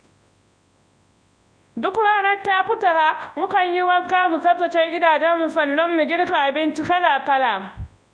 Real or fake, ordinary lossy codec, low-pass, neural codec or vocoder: fake; none; 9.9 kHz; codec, 24 kHz, 0.9 kbps, WavTokenizer, large speech release